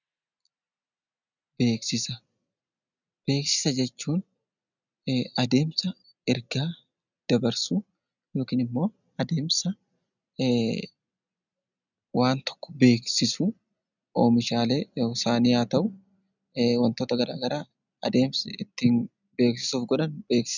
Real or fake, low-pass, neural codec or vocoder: real; 7.2 kHz; none